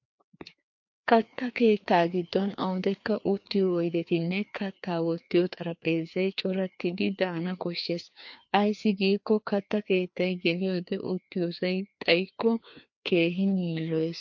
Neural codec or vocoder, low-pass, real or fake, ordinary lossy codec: codec, 16 kHz, 2 kbps, FreqCodec, larger model; 7.2 kHz; fake; MP3, 48 kbps